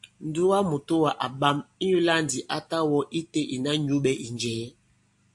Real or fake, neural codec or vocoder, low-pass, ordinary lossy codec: real; none; 10.8 kHz; AAC, 64 kbps